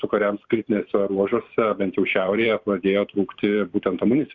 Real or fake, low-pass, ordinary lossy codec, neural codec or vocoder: fake; 7.2 kHz; Opus, 64 kbps; vocoder, 44.1 kHz, 128 mel bands every 512 samples, BigVGAN v2